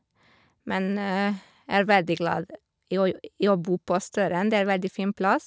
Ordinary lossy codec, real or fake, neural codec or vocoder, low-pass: none; real; none; none